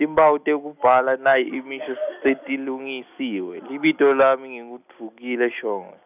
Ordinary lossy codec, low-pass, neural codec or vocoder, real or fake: none; 3.6 kHz; none; real